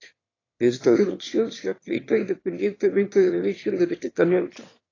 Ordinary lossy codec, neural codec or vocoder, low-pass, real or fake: AAC, 32 kbps; autoencoder, 22.05 kHz, a latent of 192 numbers a frame, VITS, trained on one speaker; 7.2 kHz; fake